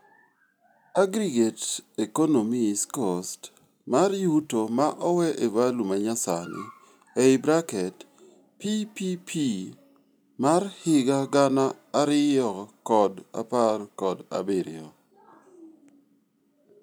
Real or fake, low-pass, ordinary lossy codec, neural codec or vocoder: real; none; none; none